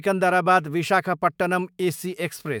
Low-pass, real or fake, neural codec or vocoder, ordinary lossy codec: none; fake; autoencoder, 48 kHz, 128 numbers a frame, DAC-VAE, trained on Japanese speech; none